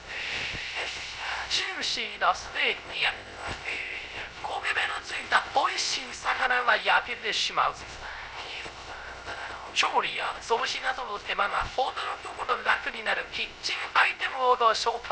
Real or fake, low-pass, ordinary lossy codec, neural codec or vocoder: fake; none; none; codec, 16 kHz, 0.3 kbps, FocalCodec